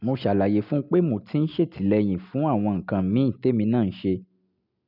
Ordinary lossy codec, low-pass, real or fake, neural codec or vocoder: none; 5.4 kHz; real; none